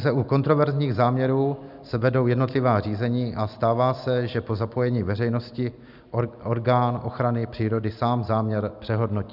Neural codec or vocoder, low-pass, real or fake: none; 5.4 kHz; real